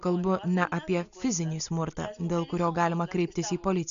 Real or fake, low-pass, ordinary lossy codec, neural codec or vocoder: real; 7.2 kHz; AAC, 96 kbps; none